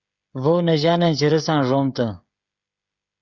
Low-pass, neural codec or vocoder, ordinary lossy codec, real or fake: 7.2 kHz; codec, 16 kHz, 16 kbps, FreqCodec, smaller model; Opus, 64 kbps; fake